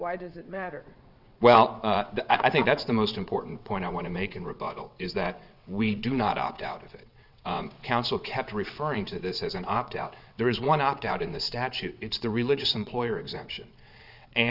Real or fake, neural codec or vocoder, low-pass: fake; vocoder, 22.05 kHz, 80 mel bands, WaveNeXt; 5.4 kHz